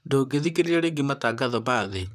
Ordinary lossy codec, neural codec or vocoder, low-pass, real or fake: none; vocoder, 44.1 kHz, 128 mel bands, Pupu-Vocoder; 14.4 kHz; fake